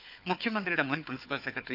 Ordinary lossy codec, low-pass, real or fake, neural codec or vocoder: none; 5.4 kHz; fake; codec, 16 kHz, 4 kbps, FunCodec, trained on Chinese and English, 50 frames a second